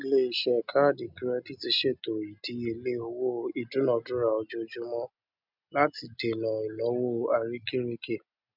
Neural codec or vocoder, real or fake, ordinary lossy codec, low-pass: none; real; none; 5.4 kHz